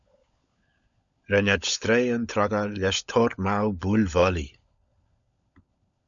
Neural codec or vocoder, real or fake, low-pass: codec, 16 kHz, 16 kbps, FunCodec, trained on LibriTTS, 50 frames a second; fake; 7.2 kHz